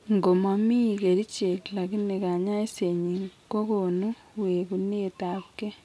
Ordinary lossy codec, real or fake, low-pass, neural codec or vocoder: none; real; none; none